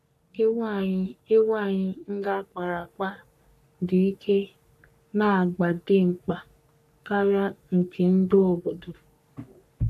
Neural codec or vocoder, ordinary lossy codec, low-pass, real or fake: codec, 44.1 kHz, 3.4 kbps, Pupu-Codec; none; 14.4 kHz; fake